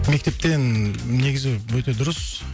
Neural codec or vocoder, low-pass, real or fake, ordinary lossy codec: none; none; real; none